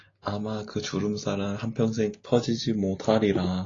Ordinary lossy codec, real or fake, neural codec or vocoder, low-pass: AAC, 32 kbps; real; none; 7.2 kHz